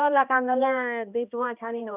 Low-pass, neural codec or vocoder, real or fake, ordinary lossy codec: 3.6 kHz; codec, 16 kHz, 1 kbps, X-Codec, HuBERT features, trained on balanced general audio; fake; none